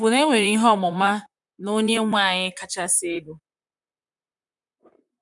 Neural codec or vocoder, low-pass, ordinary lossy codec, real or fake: vocoder, 44.1 kHz, 128 mel bands, Pupu-Vocoder; 10.8 kHz; none; fake